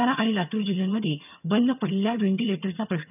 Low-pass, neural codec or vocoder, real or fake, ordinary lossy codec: 3.6 kHz; vocoder, 22.05 kHz, 80 mel bands, HiFi-GAN; fake; none